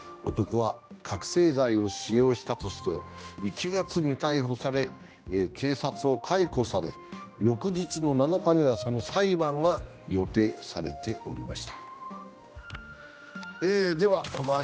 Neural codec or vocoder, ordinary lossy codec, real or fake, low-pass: codec, 16 kHz, 1 kbps, X-Codec, HuBERT features, trained on general audio; none; fake; none